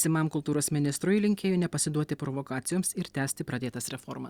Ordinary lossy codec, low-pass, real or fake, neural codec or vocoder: Opus, 64 kbps; 19.8 kHz; real; none